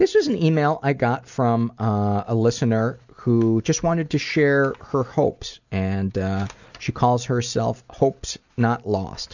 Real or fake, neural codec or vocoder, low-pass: real; none; 7.2 kHz